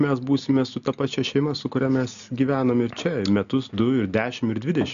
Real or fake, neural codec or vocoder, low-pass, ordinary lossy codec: real; none; 7.2 kHz; AAC, 96 kbps